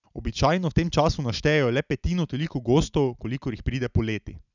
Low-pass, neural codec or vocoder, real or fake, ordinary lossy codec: 7.2 kHz; none; real; none